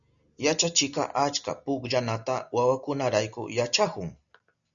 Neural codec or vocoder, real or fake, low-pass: none; real; 7.2 kHz